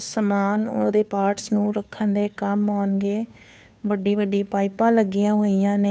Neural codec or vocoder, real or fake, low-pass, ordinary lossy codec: codec, 16 kHz, 2 kbps, FunCodec, trained on Chinese and English, 25 frames a second; fake; none; none